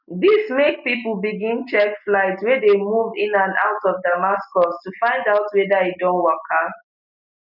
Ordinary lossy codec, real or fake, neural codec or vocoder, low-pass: none; real; none; 5.4 kHz